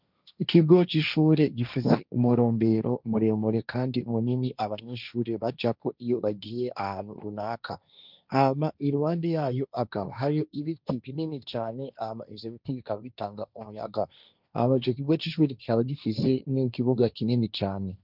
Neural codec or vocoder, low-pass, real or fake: codec, 16 kHz, 1.1 kbps, Voila-Tokenizer; 5.4 kHz; fake